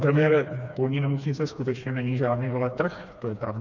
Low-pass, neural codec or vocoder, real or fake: 7.2 kHz; codec, 16 kHz, 2 kbps, FreqCodec, smaller model; fake